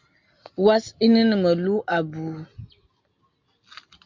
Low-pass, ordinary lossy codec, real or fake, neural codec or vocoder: 7.2 kHz; MP3, 64 kbps; real; none